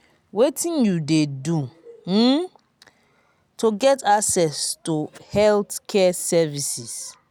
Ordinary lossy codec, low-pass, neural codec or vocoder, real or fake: none; none; none; real